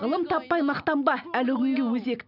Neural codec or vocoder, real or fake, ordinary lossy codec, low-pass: none; real; none; 5.4 kHz